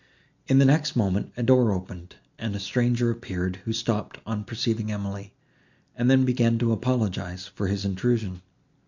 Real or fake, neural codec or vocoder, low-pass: real; none; 7.2 kHz